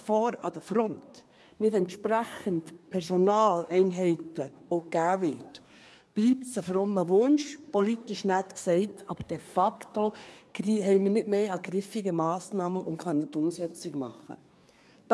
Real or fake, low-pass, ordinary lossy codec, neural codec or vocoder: fake; none; none; codec, 24 kHz, 1 kbps, SNAC